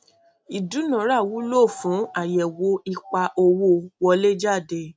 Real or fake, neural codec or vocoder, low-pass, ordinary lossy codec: real; none; none; none